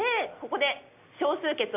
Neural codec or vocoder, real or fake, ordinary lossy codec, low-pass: none; real; AAC, 32 kbps; 3.6 kHz